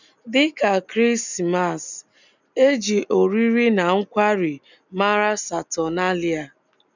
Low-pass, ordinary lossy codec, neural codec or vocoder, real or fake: 7.2 kHz; none; none; real